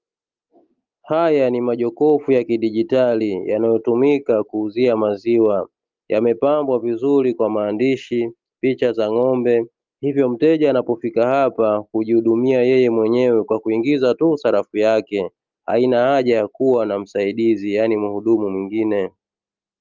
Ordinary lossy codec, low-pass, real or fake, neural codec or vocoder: Opus, 32 kbps; 7.2 kHz; real; none